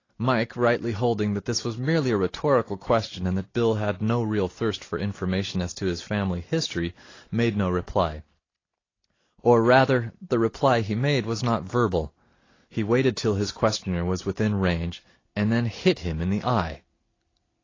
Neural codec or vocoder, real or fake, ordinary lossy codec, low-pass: none; real; AAC, 32 kbps; 7.2 kHz